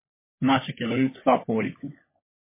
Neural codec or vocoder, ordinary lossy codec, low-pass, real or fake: codec, 16 kHz, 16 kbps, FunCodec, trained on LibriTTS, 50 frames a second; MP3, 16 kbps; 3.6 kHz; fake